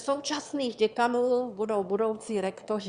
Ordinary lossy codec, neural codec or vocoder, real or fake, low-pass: Opus, 64 kbps; autoencoder, 22.05 kHz, a latent of 192 numbers a frame, VITS, trained on one speaker; fake; 9.9 kHz